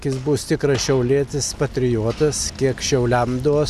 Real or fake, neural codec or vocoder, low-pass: real; none; 14.4 kHz